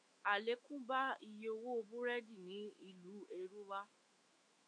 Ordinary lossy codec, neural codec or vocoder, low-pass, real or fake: MP3, 48 kbps; autoencoder, 48 kHz, 128 numbers a frame, DAC-VAE, trained on Japanese speech; 9.9 kHz; fake